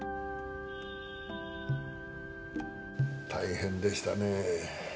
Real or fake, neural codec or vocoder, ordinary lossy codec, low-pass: real; none; none; none